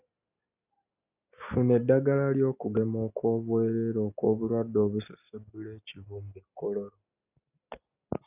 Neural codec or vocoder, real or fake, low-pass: none; real; 3.6 kHz